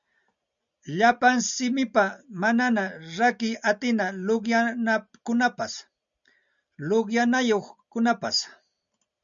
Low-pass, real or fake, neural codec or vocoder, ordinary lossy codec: 7.2 kHz; real; none; MP3, 96 kbps